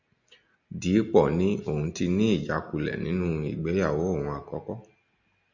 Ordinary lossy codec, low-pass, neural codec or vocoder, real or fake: Opus, 64 kbps; 7.2 kHz; none; real